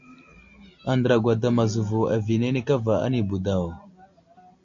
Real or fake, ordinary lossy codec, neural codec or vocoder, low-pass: real; AAC, 64 kbps; none; 7.2 kHz